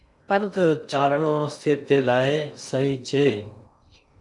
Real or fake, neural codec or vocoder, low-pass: fake; codec, 16 kHz in and 24 kHz out, 0.6 kbps, FocalCodec, streaming, 2048 codes; 10.8 kHz